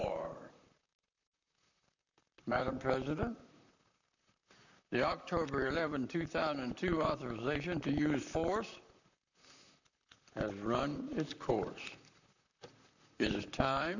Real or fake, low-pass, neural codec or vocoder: fake; 7.2 kHz; vocoder, 44.1 kHz, 128 mel bands, Pupu-Vocoder